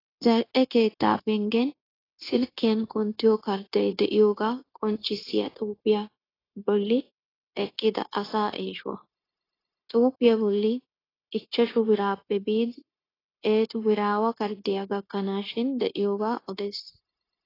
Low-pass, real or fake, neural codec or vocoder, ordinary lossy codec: 5.4 kHz; fake; codec, 16 kHz, 0.9 kbps, LongCat-Audio-Codec; AAC, 24 kbps